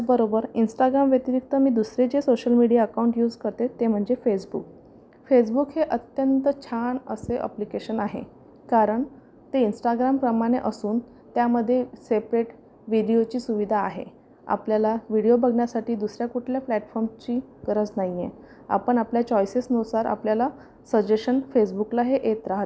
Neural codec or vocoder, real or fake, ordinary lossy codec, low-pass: none; real; none; none